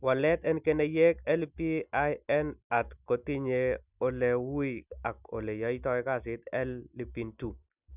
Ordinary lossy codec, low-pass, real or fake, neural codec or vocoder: none; 3.6 kHz; real; none